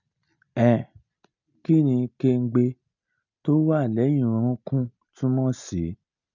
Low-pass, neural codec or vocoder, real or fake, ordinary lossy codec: 7.2 kHz; none; real; none